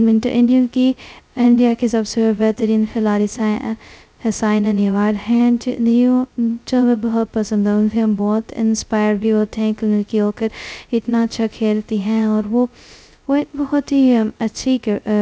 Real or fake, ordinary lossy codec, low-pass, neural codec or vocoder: fake; none; none; codec, 16 kHz, 0.2 kbps, FocalCodec